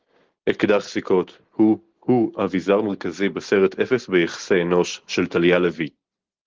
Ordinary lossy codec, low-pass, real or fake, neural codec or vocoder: Opus, 16 kbps; 7.2 kHz; real; none